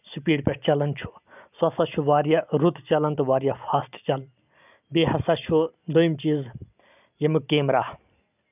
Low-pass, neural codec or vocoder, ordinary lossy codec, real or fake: 3.6 kHz; none; none; real